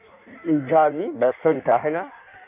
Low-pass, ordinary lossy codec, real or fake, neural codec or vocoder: 3.6 kHz; none; fake; codec, 16 kHz in and 24 kHz out, 1.1 kbps, FireRedTTS-2 codec